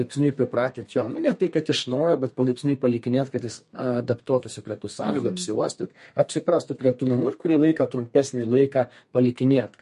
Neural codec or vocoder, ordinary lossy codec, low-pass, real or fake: codec, 32 kHz, 1.9 kbps, SNAC; MP3, 48 kbps; 14.4 kHz; fake